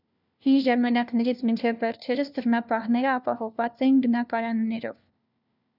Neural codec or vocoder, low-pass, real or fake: codec, 16 kHz, 1 kbps, FunCodec, trained on LibriTTS, 50 frames a second; 5.4 kHz; fake